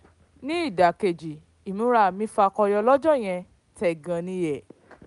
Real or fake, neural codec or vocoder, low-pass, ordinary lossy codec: real; none; 10.8 kHz; none